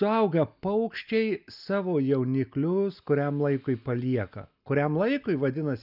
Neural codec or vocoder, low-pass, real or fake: none; 5.4 kHz; real